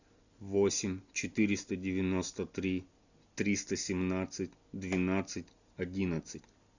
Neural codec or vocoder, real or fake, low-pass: none; real; 7.2 kHz